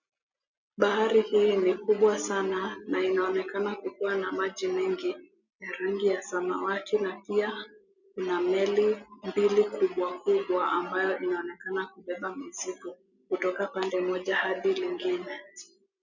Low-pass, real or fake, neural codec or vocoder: 7.2 kHz; real; none